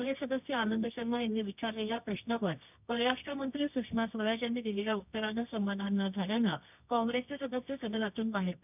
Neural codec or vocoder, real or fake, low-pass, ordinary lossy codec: codec, 24 kHz, 0.9 kbps, WavTokenizer, medium music audio release; fake; 3.6 kHz; none